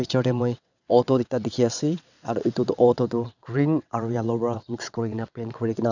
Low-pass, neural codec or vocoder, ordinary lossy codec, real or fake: 7.2 kHz; vocoder, 22.05 kHz, 80 mel bands, WaveNeXt; none; fake